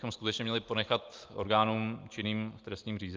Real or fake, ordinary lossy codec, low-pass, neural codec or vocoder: real; Opus, 24 kbps; 7.2 kHz; none